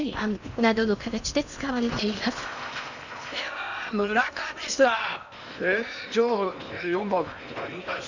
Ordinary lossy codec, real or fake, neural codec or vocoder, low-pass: none; fake; codec, 16 kHz in and 24 kHz out, 0.8 kbps, FocalCodec, streaming, 65536 codes; 7.2 kHz